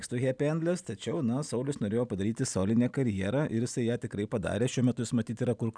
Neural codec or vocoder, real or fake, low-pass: none; real; 9.9 kHz